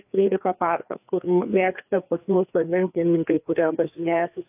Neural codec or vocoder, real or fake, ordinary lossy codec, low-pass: codec, 16 kHz, 2 kbps, FreqCodec, larger model; fake; AAC, 32 kbps; 3.6 kHz